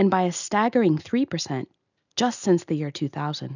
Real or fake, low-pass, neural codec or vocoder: real; 7.2 kHz; none